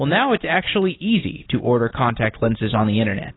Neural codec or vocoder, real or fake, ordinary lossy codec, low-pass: none; real; AAC, 16 kbps; 7.2 kHz